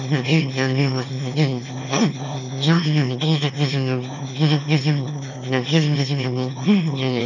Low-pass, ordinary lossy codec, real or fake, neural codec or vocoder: 7.2 kHz; none; fake; autoencoder, 22.05 kHz, a latent of 192 numbers a frame, VITS, trained on one speaker